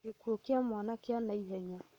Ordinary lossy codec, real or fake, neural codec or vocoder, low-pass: none; fake; codec, 44.1 kHz, 7.8 kbps, Pupu-Codec; 19.8 kHz